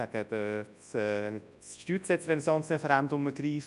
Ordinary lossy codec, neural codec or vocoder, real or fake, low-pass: none; codec, 24 kHz, 0.9 kbps, WavTokenizer, large speech release; fake; 10.8 kHz